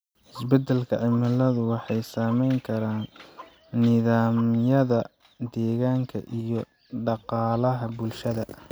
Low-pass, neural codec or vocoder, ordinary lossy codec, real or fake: none; none; none; real